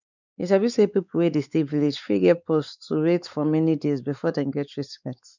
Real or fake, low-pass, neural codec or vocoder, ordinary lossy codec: fake; 7.2 kHz; codec, 16 kHz, 4 kbps, X-Codec, WavLM features, trained on Multilingual LibriSpeech; none